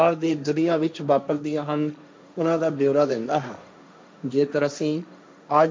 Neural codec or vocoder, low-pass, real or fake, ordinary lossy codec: codec, 16 kHz, 1.1 kbps, Voila-Tokenizer; none; fake; none